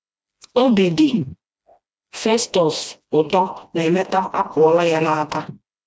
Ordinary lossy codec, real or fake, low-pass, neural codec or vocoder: none; fake; none; codec, 16 kHz, 1 kbps, FreqCodec, smaller model